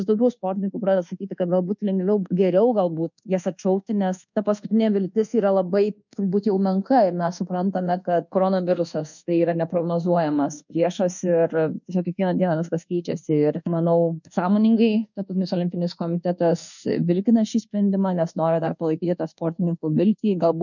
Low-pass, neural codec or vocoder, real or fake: 7.2 kHz; codec, 24 kHz, 1.2 kbps, DualCodec; fake